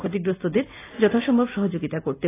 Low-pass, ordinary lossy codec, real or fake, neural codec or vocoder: 3.6 kHz; AAC, 16 kbps; real; none